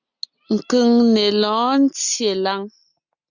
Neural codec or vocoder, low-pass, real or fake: none; 7.2 kHz; real